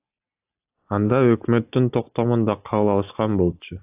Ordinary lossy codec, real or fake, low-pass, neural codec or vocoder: AAC, 32 kbps; real; 3.6 kHz; none